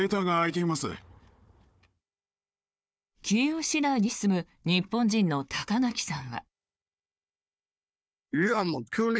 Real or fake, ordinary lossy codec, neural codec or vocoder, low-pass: fake; none; codec, 16 kHz, 4 kbps, FunCodec, trained on Chinese and English, 50 frames a second; none